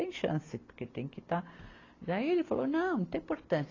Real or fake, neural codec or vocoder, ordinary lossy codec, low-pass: real; none; AAC, 32 kbps; 7.2 kHz